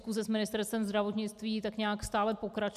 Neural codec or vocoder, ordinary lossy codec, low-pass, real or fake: none; AAC, 96 kbps; 14.4 kHz; real